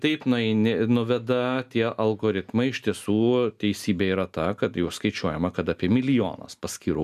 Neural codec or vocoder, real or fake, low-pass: none; real; 14.4 kHz